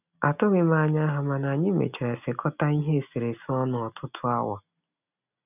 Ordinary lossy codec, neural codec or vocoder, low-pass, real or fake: none; none; 3.6 kHz; real